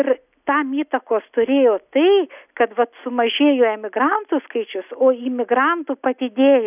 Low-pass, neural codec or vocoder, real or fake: 3.6 kHz; none; real